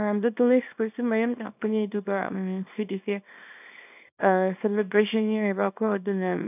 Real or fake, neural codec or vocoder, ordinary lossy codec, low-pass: fake; codec, 24 kHz, 0.9 kbps, WavTokenizer, small release; none; 3.6 kHz